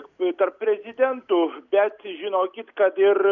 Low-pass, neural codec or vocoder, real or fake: 7.2 kHz; none; real